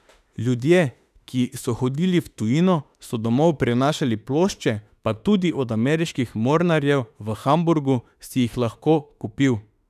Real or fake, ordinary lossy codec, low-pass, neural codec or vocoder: fake; none; 14.4 kHz; autoencoder, 48 kHz, 32 numbers a frame, DAC-VAE, trained on Japanese speech